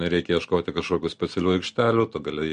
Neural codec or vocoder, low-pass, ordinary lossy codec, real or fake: none; 9.9 kHz; MP3, 48 kbps; real